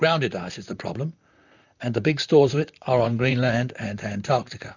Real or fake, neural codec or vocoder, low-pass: fake; vocoder, 44.1 kHz, 128 mel bands, Pupu-Vocoder; 7.2 kHz